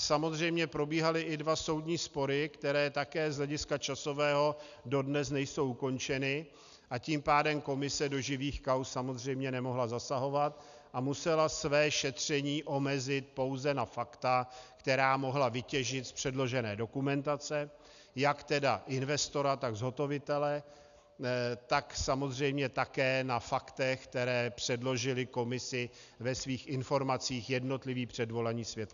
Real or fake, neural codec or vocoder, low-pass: real; none; 7.2 kHz